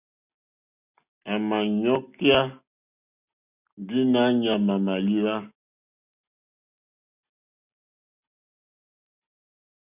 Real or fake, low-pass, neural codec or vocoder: real; 3.6 kHz; none